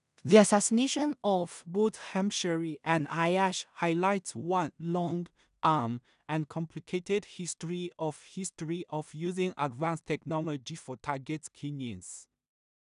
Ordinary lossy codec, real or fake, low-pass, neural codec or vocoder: none; fake; 10.8 kHz; codec, 16 kHz in and 24 kHz out, 0.4 kbps, LongCat-Audio-Codec, two codebook decoder